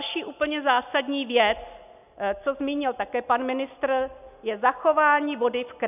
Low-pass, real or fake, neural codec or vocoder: 3.6 kHz; real; none